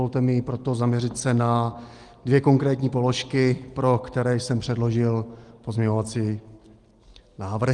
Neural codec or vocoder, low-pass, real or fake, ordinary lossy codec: none; 10.8 kHz; real; Opus, 24 kbps